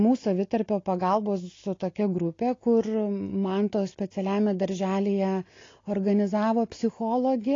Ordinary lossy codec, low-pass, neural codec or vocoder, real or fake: AAC, 32 kbps; 7.2 kHz; none; real